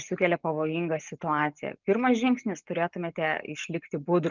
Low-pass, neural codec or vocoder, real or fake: 7.2 kHz; none; real